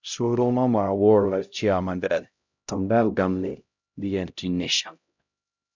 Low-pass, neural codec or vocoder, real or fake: 7.2 kHz; codec, 16 kHz, 0.5 kbps, X-Codec, HuBERT features, trained on LibriSpeech; fake